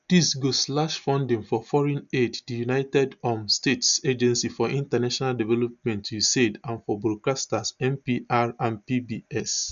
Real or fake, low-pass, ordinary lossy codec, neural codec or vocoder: real; 7.2 kHz; none; none